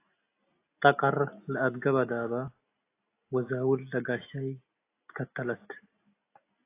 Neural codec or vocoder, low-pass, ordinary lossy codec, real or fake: none; 3.6 kHz; AAC, 24 kbps; real